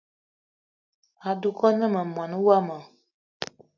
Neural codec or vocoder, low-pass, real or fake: none; 7.2 kHz; real